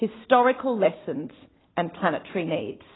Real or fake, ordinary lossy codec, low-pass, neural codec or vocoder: real; AAC, 16 kbps; 7.2 kHz; none